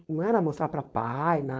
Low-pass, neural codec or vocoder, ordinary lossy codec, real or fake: none; codec, 16 kHz, 4.8 kbps, FACodec; none; fake